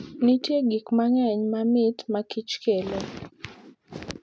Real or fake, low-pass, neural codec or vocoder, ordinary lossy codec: real; none; none; none